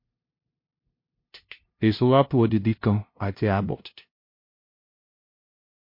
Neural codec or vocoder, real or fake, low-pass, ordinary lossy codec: codec, 16 kHz, 0.5 kbps, FunCodec, trained on LibriTTS, 25 frames a second; fake; 5.4 kHz; MP3, 32 kbps